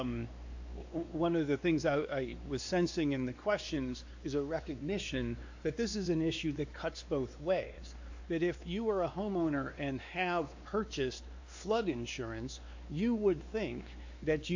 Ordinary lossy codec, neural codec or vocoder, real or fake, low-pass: AAC, 48 kbps; codec, 16 kHz, 2 kbps, X-Codec, WavLM features, trained on Multilingual LibriSpeech; fake; 7.2 kHz